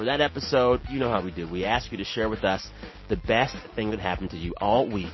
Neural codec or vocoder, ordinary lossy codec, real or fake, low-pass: none; MP3, 24 kbps; real; 7.2 kHz